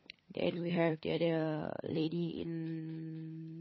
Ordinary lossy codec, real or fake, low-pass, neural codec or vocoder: MP3, 24 kbps; fake; 7.2 kHz; codec, 16 kHz, 16 kbps, FunCodec, trained on LibriTTS, 50 frames a second